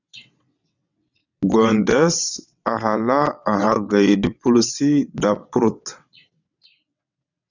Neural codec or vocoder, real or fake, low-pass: vocoder, 22.05 kHz, 80 mel bands, WaveNeXt; fake; 7.2 kHz